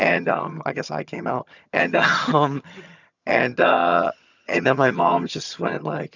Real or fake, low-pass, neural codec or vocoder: fake; 7.2 kHz; vocoder, 22.05 kHz, 80 mel bands, HiFi-GAN